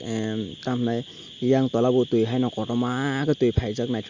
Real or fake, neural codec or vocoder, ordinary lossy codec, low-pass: real; none; Opus, 64 kbps; 7.2 kHz